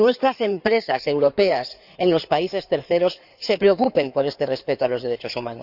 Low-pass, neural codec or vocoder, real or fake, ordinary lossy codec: 5.4 kHz; codec, 16 kHz in and 24 kHz out, 2.2 kbps, FireRedTTS-2 codec; fake; none